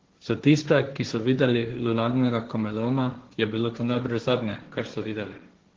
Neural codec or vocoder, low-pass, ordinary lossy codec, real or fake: codec, 16 kHz, 1.1 kbps, Voila-Tokenizer; 7.2 kHz; Opus, 16 kbps; fake